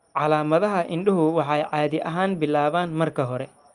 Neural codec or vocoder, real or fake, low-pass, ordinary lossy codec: none; real; 10.8 kHz; Opus, 32 kbps